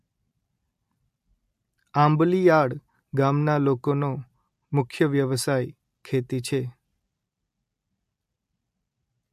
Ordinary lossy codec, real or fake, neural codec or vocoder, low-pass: MP3, 64 kbps; real; none; 14.4 kHz